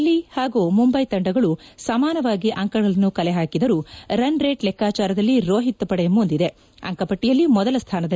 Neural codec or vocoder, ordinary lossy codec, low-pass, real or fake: none; none; none; real